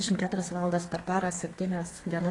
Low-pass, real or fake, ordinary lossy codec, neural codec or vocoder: 10.8 kHz; fake; AAC, 32 kbps; codec, 32 kHz, 1.9 kbps, SNAC